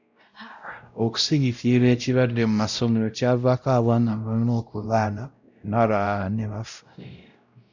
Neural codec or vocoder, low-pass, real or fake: codec, 16 kHz, 0.5 kbps, X-Codec, WavLM features, trained on Multilingual LibriSpeech; 7.2 kHz; fake